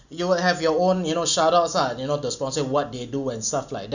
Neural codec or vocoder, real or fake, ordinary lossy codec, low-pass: none; real; none; 7.2 kHz